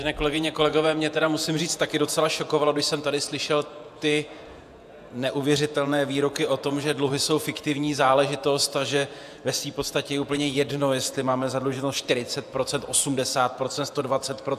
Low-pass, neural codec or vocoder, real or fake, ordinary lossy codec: 14.4 kHz; vocoder, 48 kHz, 128 mel bands, Vocos; fake; AAC, 96 kbps